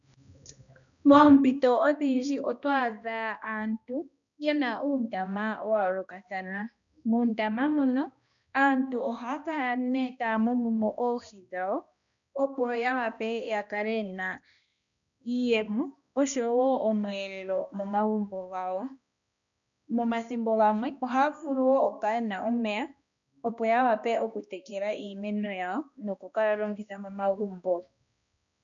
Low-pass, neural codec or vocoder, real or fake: 7.2 kHz; codec, 16 kHz, 1 kbps, X-Codec, HuBERT features, trained on balanced general audio; fake